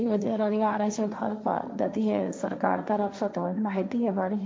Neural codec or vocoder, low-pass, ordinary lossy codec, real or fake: codec, 16 kHz, 1.1 kbps, Voila-Tokenizer; none; none; fake